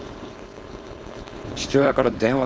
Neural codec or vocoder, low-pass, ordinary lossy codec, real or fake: codec, 16 kHz, 4.8 kbps, FACodec; none; none; fake